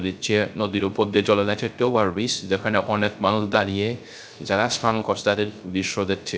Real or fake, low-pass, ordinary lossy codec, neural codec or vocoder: fake; none; none; codec, 16 kHz, 0.3 kbps, FocalCodec